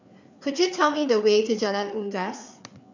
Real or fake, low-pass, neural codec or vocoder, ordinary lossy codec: fake; 7.2 kHz; codec, 16 kHz, 4 kbps, FreqCodec, larger model; none